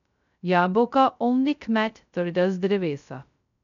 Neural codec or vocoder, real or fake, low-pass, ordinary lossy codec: codec, 16 kHz, 0.2 kbps, FocalCodec; fake; 7.2 kHz; none